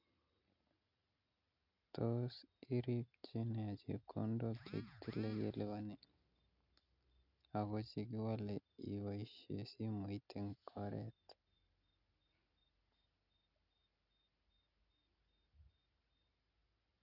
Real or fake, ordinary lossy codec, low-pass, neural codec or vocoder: real; none; 5.4 kHz; none